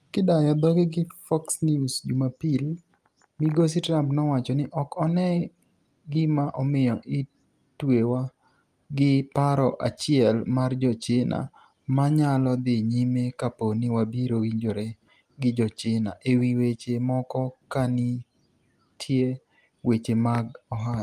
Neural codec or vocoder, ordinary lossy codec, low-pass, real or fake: none; Opus, 32 kbps; 14.4 kHz; real